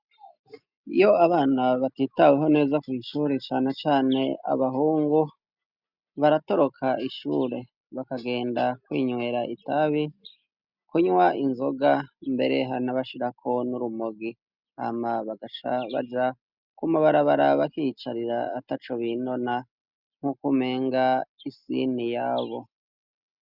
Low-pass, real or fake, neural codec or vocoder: 5.4 kHz; real; none